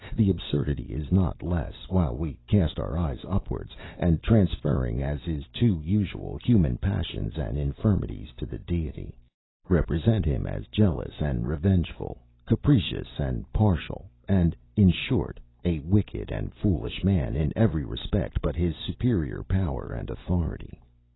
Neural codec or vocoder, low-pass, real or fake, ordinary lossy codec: none; 7.2 kHz; real; AAC, 16 kbps